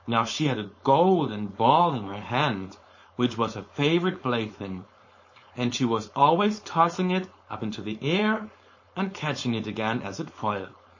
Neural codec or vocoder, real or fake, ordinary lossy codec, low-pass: codec, 16 kHz, 4.8 kbps, FACodec; fake; MP3, 32 kbps; 7.2 kHz